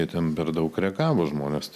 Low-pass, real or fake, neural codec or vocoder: 14.4 kHz; real; none